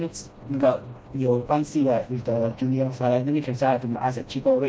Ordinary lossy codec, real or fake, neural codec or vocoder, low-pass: none; fake; codec, 16 kHz, 1 kbps, FreqCodec, smaller model; none